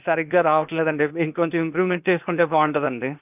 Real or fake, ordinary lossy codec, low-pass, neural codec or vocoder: fake; none; 3.6 kHz; codec, 16 kHz, 0.8 kbps, ZipCodec